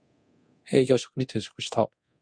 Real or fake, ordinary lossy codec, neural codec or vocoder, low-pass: fake; MP3, 64 kbps; codec, 24 kHz, 0.9 kbps, DualCodec; 9.9 kHz